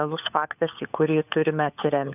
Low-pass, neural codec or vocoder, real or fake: 3.6 kHz; codec, 16 kHz, 4 kbps, FunCodec, trained on LibriTTS, 50 frames a second; fake